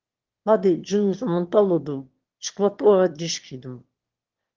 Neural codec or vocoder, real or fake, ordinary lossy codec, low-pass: autoencoder, 22.05 kHz, a latent of 192 numbers a frame, VITS, trained on one speaker; fake; Opus, 16 kbps; 7.2 kHz